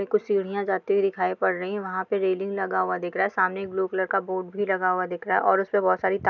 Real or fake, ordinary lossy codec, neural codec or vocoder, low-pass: real; none; none; 7.2 kHz